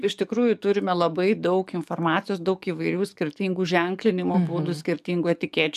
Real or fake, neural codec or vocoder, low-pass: fake; codec, 44.1 kHz, 7.8 kbps, DAC; 14.4 kHz